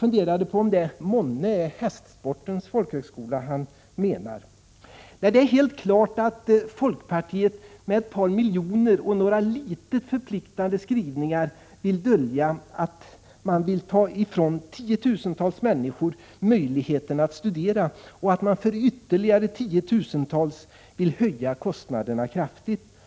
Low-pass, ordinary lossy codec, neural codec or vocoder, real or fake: none; none; none; real